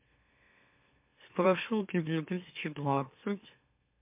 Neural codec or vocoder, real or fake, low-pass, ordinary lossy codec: autoencoder, 44.1 kHz, a latent of 192 numbers a frame, MeloTTS; fake; 3.6 kHz; MP3, 32 kbps